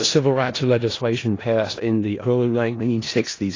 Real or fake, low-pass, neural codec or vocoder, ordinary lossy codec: fake; 7.2 kHz; codec, 16 kHz in and 24 kHz out, 0.4 kbps, LongCat-Audio-Codec, four codebook decoder; AAC, 32 kbps